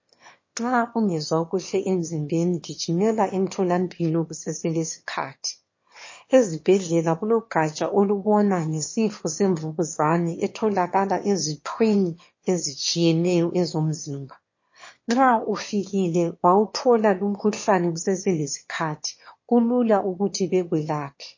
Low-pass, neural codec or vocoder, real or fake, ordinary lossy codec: 7.2 kHz; autoencoder, 22.05 kHz, a latent of 192 numbers a frame, VITS, trained on one speaker; fake; MP3, 32 kbps